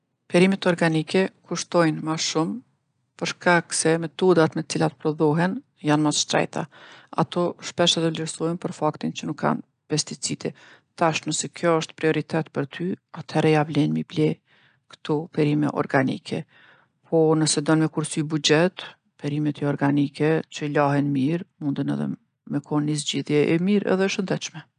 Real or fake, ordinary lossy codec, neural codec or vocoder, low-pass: real; none; none; 9.9 kHz